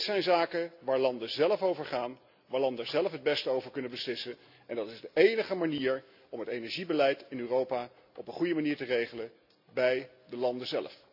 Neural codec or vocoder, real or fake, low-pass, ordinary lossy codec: none; real; 5.4 kHz; AAC, 48 kbps